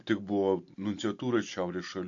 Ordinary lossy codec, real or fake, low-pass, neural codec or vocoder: MP3, 48 kbps; real; 7.2 kHz; none